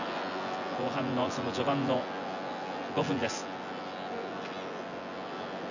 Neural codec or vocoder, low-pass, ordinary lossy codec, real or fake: vocoder, 24 kHz, 100 mel bands, Vocos; 7.2 kHz; none; fake